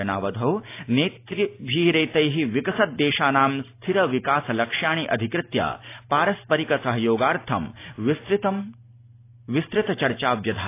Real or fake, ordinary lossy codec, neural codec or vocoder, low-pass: real; AAC, 24 kbps; none; 3.6 kHz